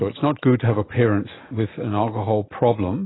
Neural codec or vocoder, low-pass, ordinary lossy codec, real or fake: none; 7.2 kHz; AAC, 16 kbps; real